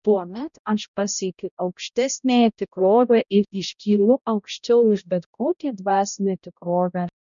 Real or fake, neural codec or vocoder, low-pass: fake; codec, 16 kHz, 0.5 kbps, X-Codec, HuBERT features, trained on balanced general audio; 7.2 kHz